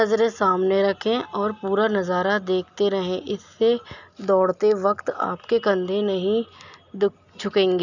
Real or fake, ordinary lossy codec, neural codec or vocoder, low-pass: real; none; none; 7.2 kHz